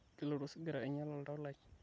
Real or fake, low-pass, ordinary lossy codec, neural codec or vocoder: real; none; none; none